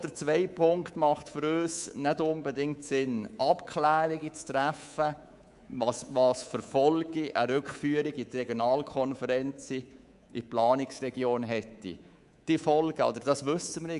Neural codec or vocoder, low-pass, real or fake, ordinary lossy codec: codec, 24 kHz, 3.1 kbps, DualCodec; 10.8 kHz; fake; AAC, 96 kbps